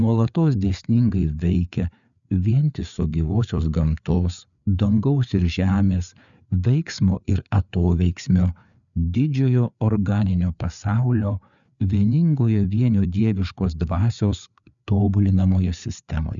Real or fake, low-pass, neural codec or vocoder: fake; 7.2 kHz; codec, 16 kHz, 4 kbps, FreqCodec, larger model